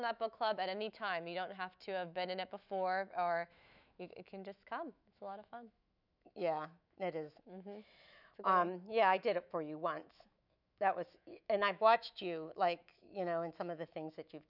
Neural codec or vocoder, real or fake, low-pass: autoencoder, 48 kHz, 128 numbers a frame, DAC-VAE, trained on Japanese speech; fake; 5.4 kHz